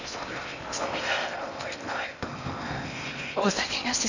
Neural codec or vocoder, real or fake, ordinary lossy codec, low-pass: codec, 16 kHz in and 24 kHz out, 0.8 kbps, FocalCodec, streaming, 65536 codes; fake; none; 7.2 kHz